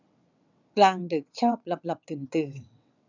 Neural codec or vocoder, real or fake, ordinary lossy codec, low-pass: vocoder, 22.05 kHz, 80 mel bands, WaveNeXt; fake; none; 7.2 kHz